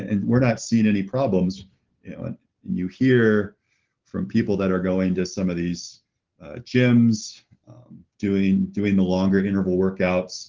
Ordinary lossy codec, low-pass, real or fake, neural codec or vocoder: Opus, 16 kbps; 7.2 kHz; real; none